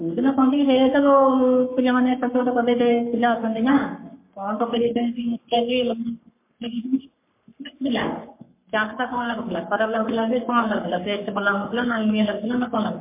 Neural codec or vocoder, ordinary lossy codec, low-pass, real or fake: codec, 44.1 kHz, 3.4 kbps, Pupu-Codec; MP3, 24 kbps; 3.6 kHz; fake